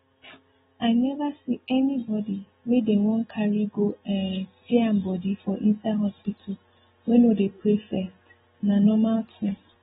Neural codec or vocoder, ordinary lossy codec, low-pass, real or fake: none; AAC, 16 kbps; 7.2 kHz; real